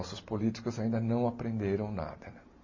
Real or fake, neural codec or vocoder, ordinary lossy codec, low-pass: real; none; MP3, 32 kbps; 7.2 kHz